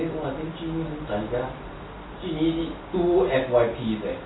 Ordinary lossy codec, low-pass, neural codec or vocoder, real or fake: AAC, 16 kbps; 7.2 kHz; none; real